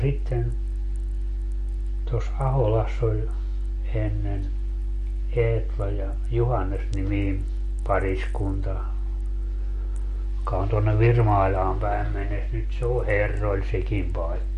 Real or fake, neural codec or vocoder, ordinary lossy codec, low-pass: real; none; MP3, 48 kbps; 14.4 kHz